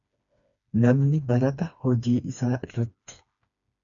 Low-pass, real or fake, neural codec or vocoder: 7.2 kHz; fake; codec, 16 kHz, 2 kbps, FreqCodec, smaller model